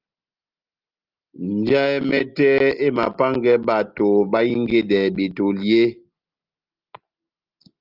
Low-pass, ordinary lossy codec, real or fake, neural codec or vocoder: 5.4 kHz; Opus, 32 kbps; real; none